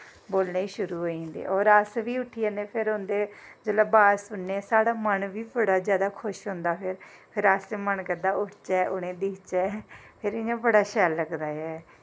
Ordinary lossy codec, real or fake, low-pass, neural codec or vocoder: none; real; none; none